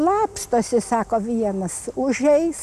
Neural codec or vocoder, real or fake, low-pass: none; real; 14.4 kHz